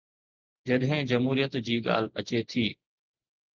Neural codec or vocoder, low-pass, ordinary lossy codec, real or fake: none; 7.2 kHz; Opus, 24 kbps; real